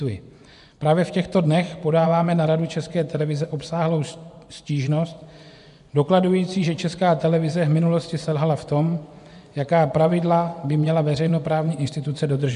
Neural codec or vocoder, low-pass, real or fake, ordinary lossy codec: vocoder, 24 kHz, 100 mel bands, Vocos; 10.8 kHz; fake; AAC, 96 kbps